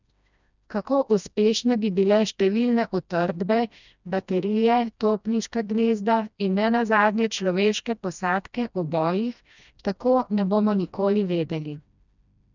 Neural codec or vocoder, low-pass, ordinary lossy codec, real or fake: codec, 16 kHz, 1 kbps, FreqCodec, smaller model; 7.2 kHz; none; fake